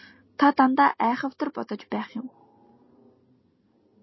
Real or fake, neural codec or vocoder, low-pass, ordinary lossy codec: real; none; 7.2 kHz; MP3, 24 kbps